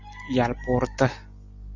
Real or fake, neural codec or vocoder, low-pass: real; none; 7.2 kHz